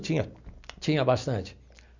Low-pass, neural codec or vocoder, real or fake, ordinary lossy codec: 7.2 kHz; none; real; none